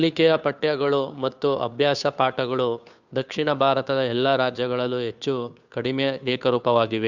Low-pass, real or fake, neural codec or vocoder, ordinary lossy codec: 7.2 kHz; fake; codec, 16 kHz, 2 kbps, FunCodec, trained on Chinese and English, 25 frames a second; Opus, 64 kbps